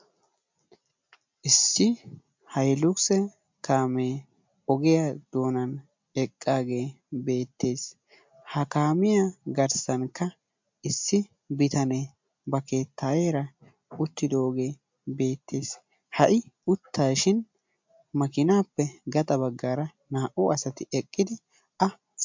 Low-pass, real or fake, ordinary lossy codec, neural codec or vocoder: 7.2 kHz; real; MP3, 64 kbps; none